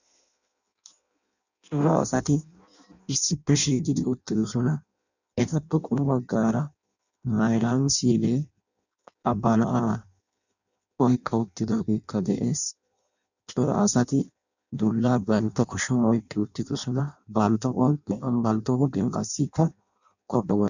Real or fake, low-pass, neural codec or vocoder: fake; 7.2 kHz; codec, 16 kHz in and 24 kHz out, 0.6 kbps, FireRedTTS-2 codec